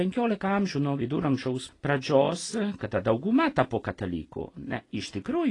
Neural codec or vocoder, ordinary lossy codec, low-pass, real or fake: none; AAC, 32 kbps; 10.8 kHz; real